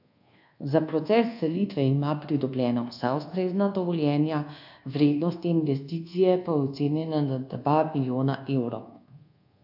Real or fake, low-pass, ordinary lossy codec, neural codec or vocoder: fake; 5.4 kHz; AAC, 48 kbps; codec, 24 kHz, 1.2 kbps, DualCodec